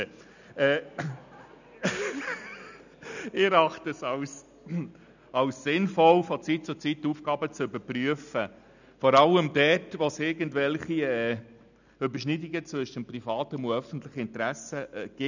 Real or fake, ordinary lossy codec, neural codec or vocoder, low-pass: real; none; none; 7.2 kHz